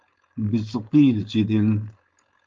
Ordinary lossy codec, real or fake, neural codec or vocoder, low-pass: Opus, 24 kbps; fake; codec, 16 kHz, 4.8 kbps, FACodec; 7.2 kHz